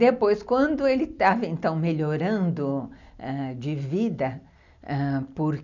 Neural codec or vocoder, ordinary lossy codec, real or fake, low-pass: none; none; real; 7.2 kHz